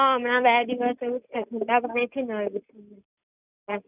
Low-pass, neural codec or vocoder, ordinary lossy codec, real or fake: 3.6 kHz; none; none; real